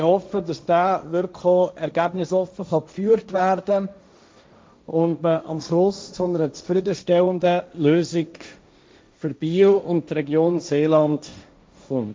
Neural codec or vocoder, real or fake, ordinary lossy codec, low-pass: codec, 16 kHz, 1.1 kbps, Voila-Tokenizer; fake; none; none